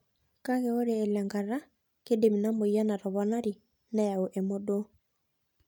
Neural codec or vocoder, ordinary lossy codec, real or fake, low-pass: none; none; real; 19.8 kHz